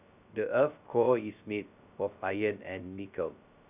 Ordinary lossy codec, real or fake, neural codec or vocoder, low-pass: none; fake; codec, 16 kHz, 0.2 kbps, FocalCodec; 3.6 kHz